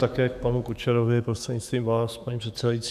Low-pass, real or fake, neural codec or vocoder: 14.4 kHz; fake; autoencoder, 48 kHz, 128 numbers a frame, DAC-VAE, trained on Japanese speech